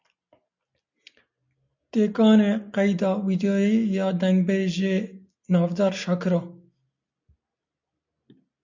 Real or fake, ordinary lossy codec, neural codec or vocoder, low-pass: real; MP3, 64 kbps; none; 7.2 kHz